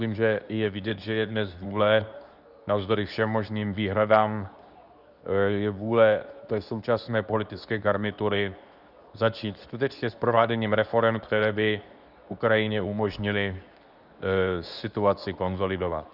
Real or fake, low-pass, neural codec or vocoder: fake; 5.4 kHz; codec, 24 kHz, 0.9 kbps, WavTokenizer, medium speech release version 2